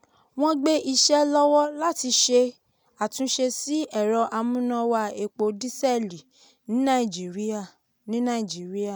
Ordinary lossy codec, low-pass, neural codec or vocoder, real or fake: none; none; none; real